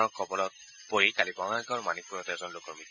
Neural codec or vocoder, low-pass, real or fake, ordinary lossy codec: none; 7.2 kHz; real; none